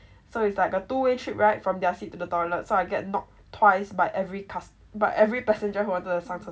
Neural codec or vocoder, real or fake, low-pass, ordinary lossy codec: none; real; none; none